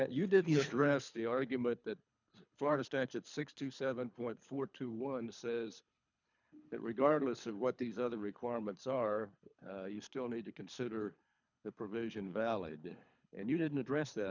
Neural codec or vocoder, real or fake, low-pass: codec, 24 kHz, 3 kbps, HILCodec; fake; 7.2 kHz